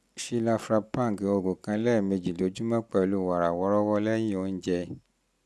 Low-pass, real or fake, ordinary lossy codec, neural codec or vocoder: none; real; none; none